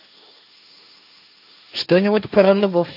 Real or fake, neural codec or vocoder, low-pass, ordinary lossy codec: fake; codec, 16 kHz, 1.1 kbps, Voila-Tokenizer; 5.4 kHz; none